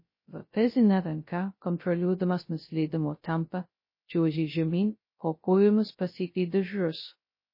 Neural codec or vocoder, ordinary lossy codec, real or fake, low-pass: codec, 16 kHz, 0.2 kbps, FocalCodec; MP3, 24 kbps; fake; 5.4 kHz